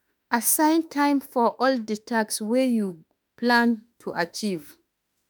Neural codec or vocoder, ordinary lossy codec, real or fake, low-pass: autoencoder, 48 kHz, 32 numbers a frame, DAC-VAE, trained on Japanese speech; none; fake; none